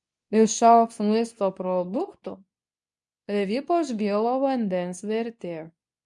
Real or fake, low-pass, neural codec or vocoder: fake; 10.8 kHz; codec, 24 kHz, 0.9 kbps, WavTokenizer, medium speech release version 2